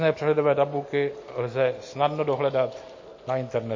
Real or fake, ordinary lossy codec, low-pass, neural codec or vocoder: real; MP3, 32 kbps; 7.2 kHz; none